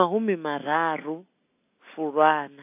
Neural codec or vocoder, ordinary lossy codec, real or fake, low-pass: none; AAC, 24 kbps; real; 3.6 kHz